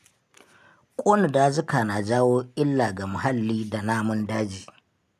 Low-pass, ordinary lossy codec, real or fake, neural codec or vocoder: 14.4 kHz; none; real; none